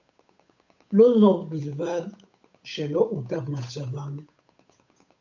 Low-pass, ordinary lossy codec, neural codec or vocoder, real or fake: 7.2 kHz; MP3, 64 kbps; codec, 16 kHz, 8 kbps, FunCodec, trained on Chinese and English, 25 frames a second; fake